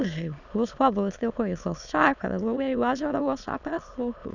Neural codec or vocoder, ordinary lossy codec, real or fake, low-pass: autoencoder, 22.05 kHz, a latent of 192 numbers a frame, VITS, trained on many speakers; none; fake; 7.2 kHz